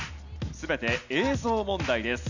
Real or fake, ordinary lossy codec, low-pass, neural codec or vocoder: real; none; 7.2 kHz; none